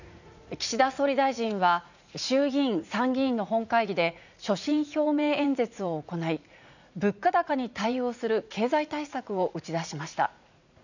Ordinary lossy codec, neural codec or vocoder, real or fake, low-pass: none; vocoder, 44.1 kHz, 80 mel bands, Vocos; fake; 7.2 kHz